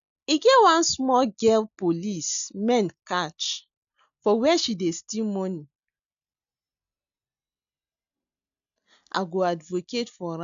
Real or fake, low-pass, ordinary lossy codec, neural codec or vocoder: real; 7.2 kHz; none; none